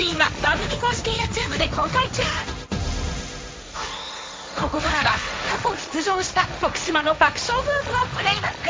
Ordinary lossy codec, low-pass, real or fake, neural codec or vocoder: none; none; fake; codec, 16 kHz, 1.1 kbps, Voila-Tokenizer